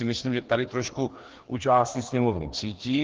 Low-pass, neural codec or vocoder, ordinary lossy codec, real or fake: 7.2 kHz; codec, 16 kHz, 2 kbps, FreqCodec, larger model; Opus, 16 kbps; fake